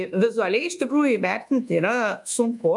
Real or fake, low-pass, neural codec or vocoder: fake; 10.8 kHz; codec, 24 kHz, 1.2 kbps, DualCodec